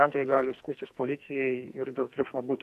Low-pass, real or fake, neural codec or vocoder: 14.4 kHz; fake; codec, 44.1 kHz, 2.6 kbps, SNAC